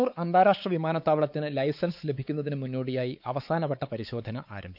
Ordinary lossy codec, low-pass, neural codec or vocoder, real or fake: none; 5.4 kHz; codec, 16 kHz, 4 kbps, X-Codec, WavLM features, trained on Multilingual LibriSpeech; fake